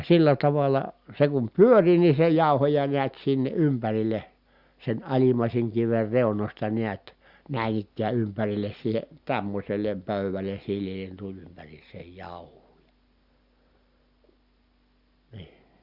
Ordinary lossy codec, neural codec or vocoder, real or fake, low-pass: Opus, 64 kbps; none; real; 5.4 kHz